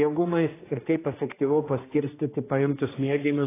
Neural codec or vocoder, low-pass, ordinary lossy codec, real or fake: codec, 16 kHz, 1 kbps, X-Codec, HuBERT features, trained on general audio; 3.6 kHz; AAC, 16 kbps; fake